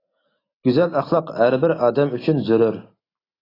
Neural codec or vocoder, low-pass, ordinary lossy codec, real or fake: none; 5.4 kHz; AAC, 24 kbps; real